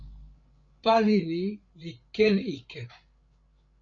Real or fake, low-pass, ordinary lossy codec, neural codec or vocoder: fake; 7.2 kHz; Opus, 64 kbps; codec, 16 kHz, 8 kbps, FreqCodec, larger model